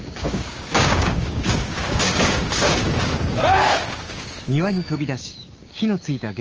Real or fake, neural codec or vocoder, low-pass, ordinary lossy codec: fake; codec, 16 kHz, 6 kbps, DAC; 7.2 kHz; Opus, 24 kbps